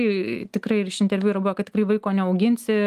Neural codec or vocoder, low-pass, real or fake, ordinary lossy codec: none; 14.4 kHz; real; Opus, 32 kbps